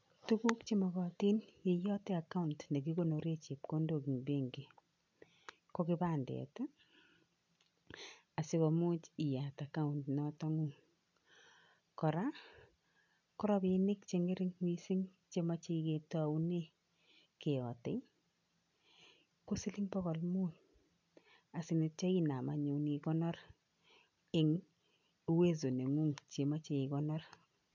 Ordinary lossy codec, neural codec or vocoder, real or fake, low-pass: none; none; real; 7.2 kHz